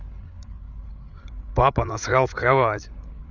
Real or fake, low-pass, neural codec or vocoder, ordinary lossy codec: fake; 7.2 kHz; codec, 16 kHz, 8 kbps, FreqCodec, larger model; none